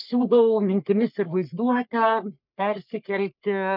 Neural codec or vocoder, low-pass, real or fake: codec, 44.1 kHz, 3.4 kbps, Pupu-Codec; 5.4 kHz; fake